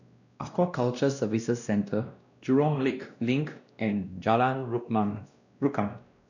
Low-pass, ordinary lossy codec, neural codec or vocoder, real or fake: 7.2 kHz; none; codec, 16 kHz, 1 kbps, X-Codec, WavLM features, trained on Multilingual LibriSpeech; fake